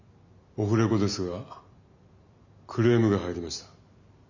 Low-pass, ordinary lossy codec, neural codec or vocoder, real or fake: 7.2 kHz; none; none; real